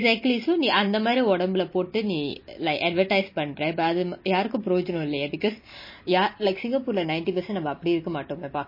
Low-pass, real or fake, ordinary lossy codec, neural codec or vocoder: 5.4 kHz; real; MP3, 24 kbps; none